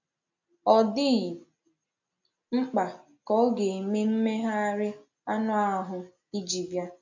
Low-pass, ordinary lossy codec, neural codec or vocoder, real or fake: 7.2 kHz; AAC, 48 kbps; none; real